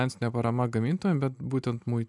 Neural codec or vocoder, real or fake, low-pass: none; real; 10.8 kHz